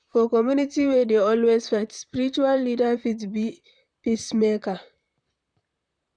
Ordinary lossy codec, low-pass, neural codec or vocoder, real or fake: none; 9.9 kHz; vocoder, 44.1 kHz, 128 mel bands, Pupu-Vocoder; fake